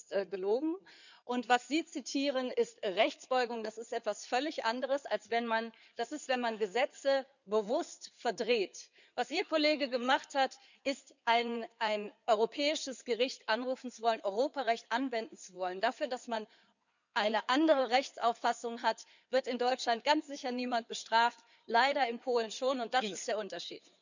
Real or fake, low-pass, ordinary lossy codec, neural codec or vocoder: fake; 7.2 kHz; none; codec, 16 kHz in and 24 kHz out, 2.2 kbps, FireRedTTS-2 codec